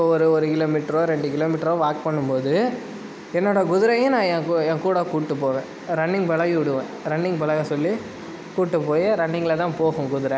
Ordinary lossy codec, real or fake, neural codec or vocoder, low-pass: none; real; none; none